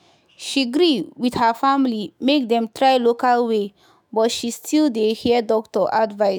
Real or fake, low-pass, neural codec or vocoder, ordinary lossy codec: fake; none; autoencoder, 48 kHz, 128 numbers a frame, DAC-VAE, trained on Japanese speech; none